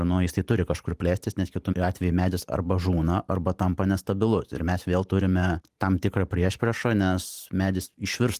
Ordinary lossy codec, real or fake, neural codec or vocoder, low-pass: Opus, 24 kbps; fake; vocoder, 44.1 kHz, 128 mel bands every 512 samples, BigVGAN v2; 14.4 kHz